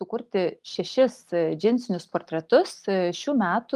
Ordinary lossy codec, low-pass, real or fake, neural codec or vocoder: Opus, 32 kbps; 14.4 kHz; real; none